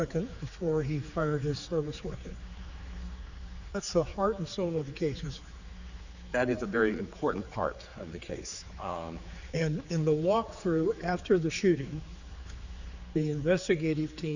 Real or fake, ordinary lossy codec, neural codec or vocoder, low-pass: fake; Opus, 64 kbps; codec, 16 kHz, 4 kbps, X-Codec, HuBERT features, trained on general audio; 7.2 kHz